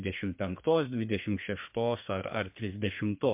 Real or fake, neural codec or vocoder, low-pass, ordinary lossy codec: fake; codec, 32 kHz, 1.9 kbps, SNAC; 3.6 kHz; MP3, 32 kbps